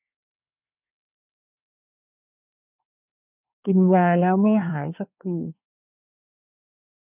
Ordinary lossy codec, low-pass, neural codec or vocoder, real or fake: none; 3.6 kHz; codec, 24 kHz, 1 kbps, SNAC; fake